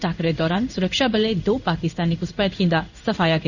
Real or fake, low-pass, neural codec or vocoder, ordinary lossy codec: fake; 7.2 kHz; codec, 16 kHz in and 24 kHz out, 1 kbps, XY-Tokenizer; none